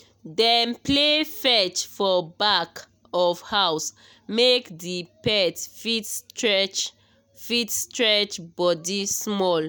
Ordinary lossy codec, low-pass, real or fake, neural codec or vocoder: none; none; real; none